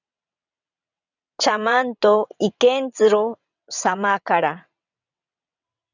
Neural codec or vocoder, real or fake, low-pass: vocoder, 22.05 kHz, 80 mel bands, WaveNeXt; fake; 7.2 kHz